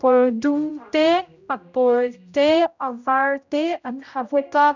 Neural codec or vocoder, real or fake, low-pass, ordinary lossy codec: codec, 16 kHz, 0.5 kbps, X-Codec, HuBERT features, trained on general audio; fake; 7.2 kHz; none